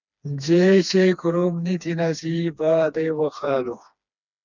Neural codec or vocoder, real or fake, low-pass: codec, 16 kHz, 2 kbps, FreqCodec, smaller model; fake; 7.2 kHz